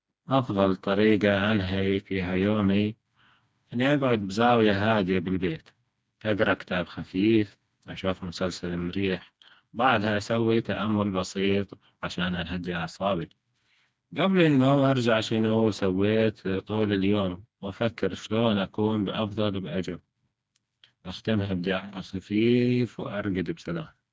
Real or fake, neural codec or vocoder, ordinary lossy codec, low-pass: fake; codec, 16 kHz, 2 kbps, FreqCodec, smaller model; none; none